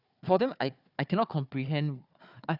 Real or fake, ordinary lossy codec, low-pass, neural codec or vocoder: fake; Opus, 64 kbps; 5.4 kHz; codec, 16 kHz, 4 kbps, FunCodec, trained on Chinese and English, 50 frames a second